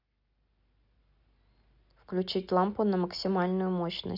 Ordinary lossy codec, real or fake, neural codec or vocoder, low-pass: none; real; none; 5.4 kHz